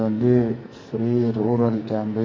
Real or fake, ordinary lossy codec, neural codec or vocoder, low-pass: fake; MP3, 32 kbps; codec, 44.1 kHz, 2.6 kbps, SNAC; 7.2 kHz